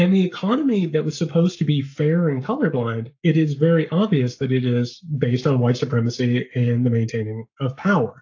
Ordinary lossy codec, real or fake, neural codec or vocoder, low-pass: AAC, 48 kbps; fake; codec, 44.1 kHz, 7.8 kbps, Pupu-Codec; 7.2 kHz